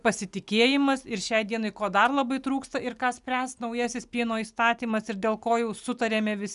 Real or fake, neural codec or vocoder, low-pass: real; none; 10.8 kHz